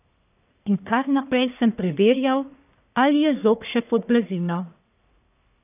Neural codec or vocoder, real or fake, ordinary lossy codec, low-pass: codec, 44.1 kHz, 1.7 kbps, Pupu-Codec; fake; none; 3.6 kHz